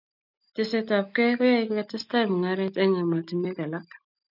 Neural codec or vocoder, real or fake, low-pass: none; real; 5.4 kHz